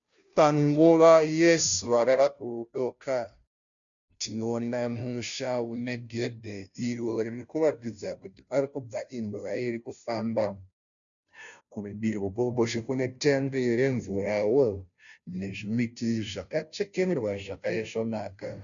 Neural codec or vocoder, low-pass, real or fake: codec, 16 kHz, 0.5 kbps, FunCodec, trained on Chinese and English, 25 frames a second; 7.2 kHz; fake